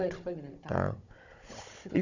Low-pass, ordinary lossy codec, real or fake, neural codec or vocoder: 7.2 kHz; none; fake; codec, 16 kHz, 16 kbps, FunCodec, trained on Chinese and English, 50 frames a second